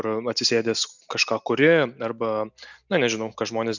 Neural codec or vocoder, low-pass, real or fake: none; 7.2 kHz; real